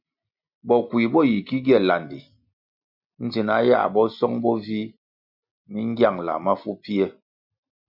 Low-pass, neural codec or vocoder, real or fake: 5.4 kHz; none; real